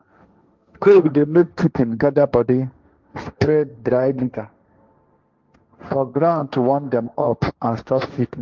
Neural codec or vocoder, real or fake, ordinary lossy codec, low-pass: codec, 16 kHz, 1.1 kbps, Voila-Tokenizer; fake; Opus, 24 kbps; 7.2 kHz